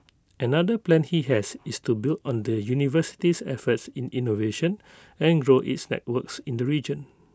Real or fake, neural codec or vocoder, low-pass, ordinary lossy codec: real; none; none; none